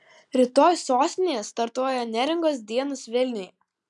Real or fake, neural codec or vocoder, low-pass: real; none; 10.8 kHz